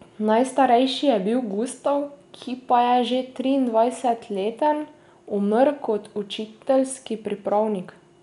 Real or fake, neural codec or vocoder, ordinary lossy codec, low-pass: real; none; none; 10.8 kHz